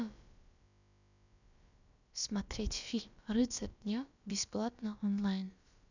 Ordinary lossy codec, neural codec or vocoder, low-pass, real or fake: none; codec, 16 kHz, about 1 kbps, DyCAST, with the encoder's durations; 7.2 kHz; fake